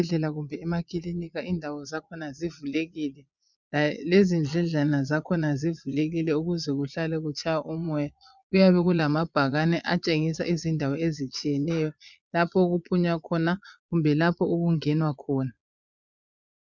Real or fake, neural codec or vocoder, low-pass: fake; vocoder, 22.05 kHz, 80 mel bands, Vocos; 7.2 kHz